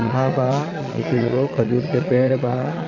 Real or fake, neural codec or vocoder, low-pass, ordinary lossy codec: fake; vocoder, 22.05 kHz, 80 mel bands, Vocos; 7.2 kHz; none